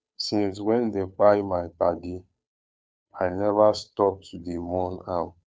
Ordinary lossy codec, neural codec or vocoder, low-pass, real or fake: none; codec, 16 kHz, 2 kbps, FunCodec, trained on Chinese and English, 25 frames a second; none; fake